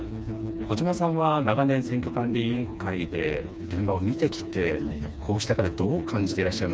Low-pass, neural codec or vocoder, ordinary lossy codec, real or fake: none; codec, 16 kHz, 2 kbps, FreqCodec, smaller model; none; fake